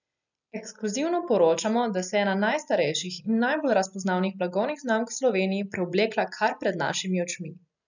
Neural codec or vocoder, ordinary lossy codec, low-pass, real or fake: none; none; 7.2 kHz; real